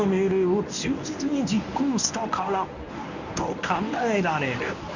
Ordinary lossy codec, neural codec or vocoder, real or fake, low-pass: none; codec, 24 kHz, 0.9 kbps, WavTokenizer, medium speech release version 1; fake; 7.2 kHz